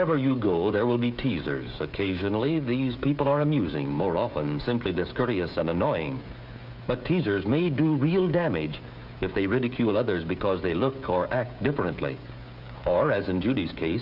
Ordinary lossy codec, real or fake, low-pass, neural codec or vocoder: Opus, 64 kbps; fake; 5.4 kHz; codec, 16 kHz, 16 kbps, FreqCodec, smaller model